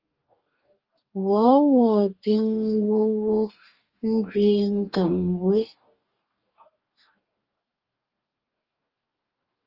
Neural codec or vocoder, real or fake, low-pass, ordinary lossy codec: codec, 44.1 kHz, 2.6 kbps, DAC; fake; 5.4 kHz; Opus, 24 kbps